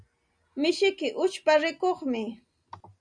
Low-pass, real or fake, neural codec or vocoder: 9.9 kHz; real; none